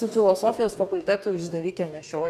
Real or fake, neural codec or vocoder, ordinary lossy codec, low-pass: fake; codec, 44.1 kHz, 2.6 kbps, DAC; AAC, 96 kbps; 14.4 kHz